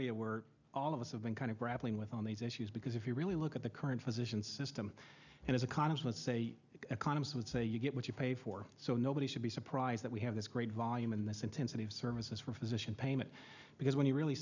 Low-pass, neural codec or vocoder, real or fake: 7.2 kHz; none; real